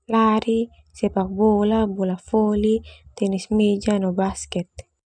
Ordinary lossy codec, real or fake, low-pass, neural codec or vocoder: none; real; 9.9 kHz; none